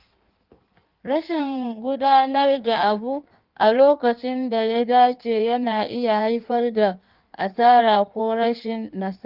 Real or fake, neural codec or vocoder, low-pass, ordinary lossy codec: fake; codec, 16 kHz in and 24 kHz out, 1.1 kbps, FireRedTTS-2 codec; 5.4 kHz; Opus, 32 kbps